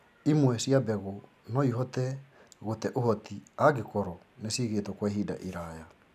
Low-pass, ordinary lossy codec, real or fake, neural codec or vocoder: 14.4 kHz; none; real; none